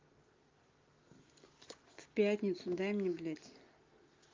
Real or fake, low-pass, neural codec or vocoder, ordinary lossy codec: real; 7.2 kHz; none; Opus, 32 kbps